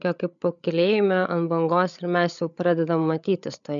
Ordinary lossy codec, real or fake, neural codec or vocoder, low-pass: MP3, 96 kbps; fake; codec, 16 kHz, 8 kbps, FreqCodec, larger model; 7.2 kHz